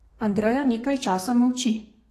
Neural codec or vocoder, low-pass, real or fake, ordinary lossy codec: codec, 32 kHz, 1.9 kbps, SNAC; 14.4 kHz; fake; AAC, 48 kbps